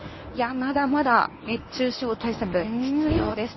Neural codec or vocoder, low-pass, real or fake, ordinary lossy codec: codec, 24 kHz, 0.9 kbps, WavTokenizer, medium speech release version 1; 7.2 kHz; fake; MP3, 24 kbps